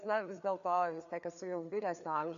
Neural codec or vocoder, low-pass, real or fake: codec, 16 kHz, 4 kbps, FreqCodec, larger model; 7.2 kHz; fake